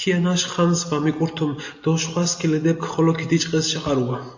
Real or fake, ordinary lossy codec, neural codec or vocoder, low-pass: real; AAC, 48 kbps; none; 7.2 kHz